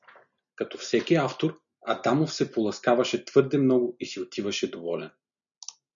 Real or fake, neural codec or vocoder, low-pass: real; none; 7.2 kHz